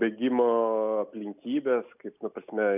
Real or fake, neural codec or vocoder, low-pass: real; none; 3.6 kHz